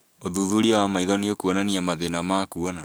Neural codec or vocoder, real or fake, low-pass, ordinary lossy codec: codec, 44.1 kHz, 7.8 kbps, DAC; fake; none; none